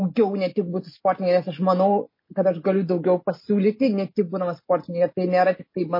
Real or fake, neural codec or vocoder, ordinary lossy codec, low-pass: real; none; MP3, 24 kbps; 5.4 kHz